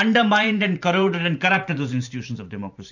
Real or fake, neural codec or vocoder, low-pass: fake; vocoder, 44.1 kHz, 128 mel bands every 512 samples, BigVGAN v2; 7.2 kHz